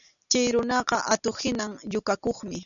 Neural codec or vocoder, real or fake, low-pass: none; real; 7.2 kHz